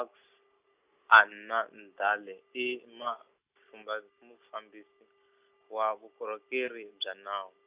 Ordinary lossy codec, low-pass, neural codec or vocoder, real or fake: Opus, 64 kbps; 3.6 kHz; none; real